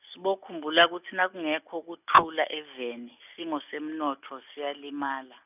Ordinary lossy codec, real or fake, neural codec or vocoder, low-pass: none; real; none; 3.6 kHz